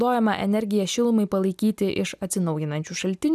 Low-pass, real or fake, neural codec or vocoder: 14.4 kHz; real; none